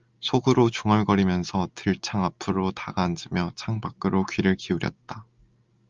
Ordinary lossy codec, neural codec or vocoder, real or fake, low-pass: Opus, 32 kbps; none; real; 7.2 kHz